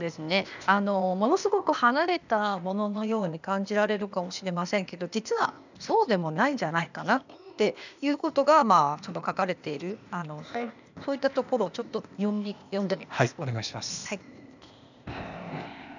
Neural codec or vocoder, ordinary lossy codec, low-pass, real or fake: codec, 16 kHz, 0.8 kbps, ZipCodec; none; 7.2 kHz; fake